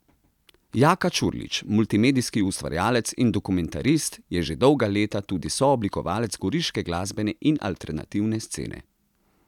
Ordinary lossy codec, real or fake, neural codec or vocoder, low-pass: none; real; none; 19.8 kHz